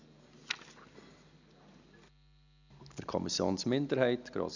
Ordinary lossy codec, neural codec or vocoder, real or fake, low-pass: none; none; real; 7.2 kHz